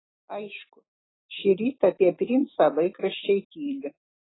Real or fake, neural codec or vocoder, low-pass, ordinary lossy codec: real; none; 7.2 kHz; AAC, 16 kbps